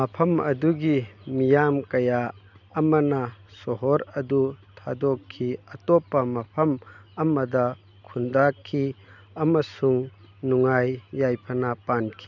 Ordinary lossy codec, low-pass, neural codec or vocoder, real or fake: none; 7.2 kHz; none; real